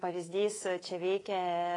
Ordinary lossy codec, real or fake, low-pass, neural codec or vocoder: AAC, 32 kbps; fake; 10.8 kHz; codec, 24 kHz, 3.1 kbps, DualCodec